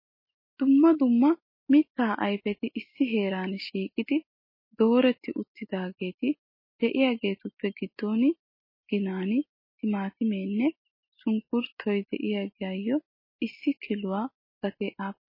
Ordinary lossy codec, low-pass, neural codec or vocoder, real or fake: MP3, 24 kbps; 5.4 kHz; none; real